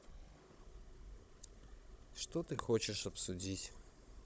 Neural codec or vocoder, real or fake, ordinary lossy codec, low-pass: codec, 16 kHz, 16 kbps, FunCodec, trained on Chinese and English, 50 frames a second; fake; none; none